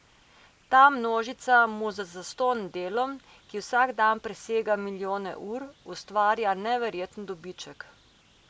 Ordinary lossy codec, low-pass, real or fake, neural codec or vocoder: none; none; real; none